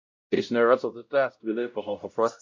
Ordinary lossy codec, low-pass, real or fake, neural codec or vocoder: MP3, 48 kbps; 7.2 kHz; fake; codec, 16 kHz, 0.5 kbps, X-Codec, WavLM features, trained on Multilingual LibriSpeech